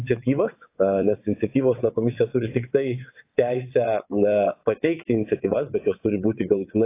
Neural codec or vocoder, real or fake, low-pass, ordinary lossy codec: vocoder, 22.05 kHz, 80 mel bands, WaveNeXt; fake; 3.6 kHz; AAC, 24 kbps